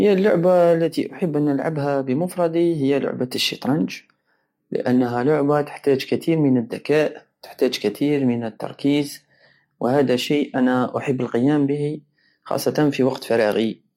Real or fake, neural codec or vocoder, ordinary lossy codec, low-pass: real; none; MP3, 64 kbps; 19.8 kHz